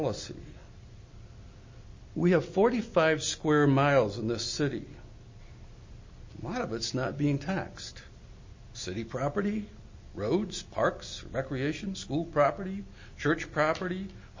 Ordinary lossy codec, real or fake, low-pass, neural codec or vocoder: MP3, 32 kbps; real; 7.2 kHz; none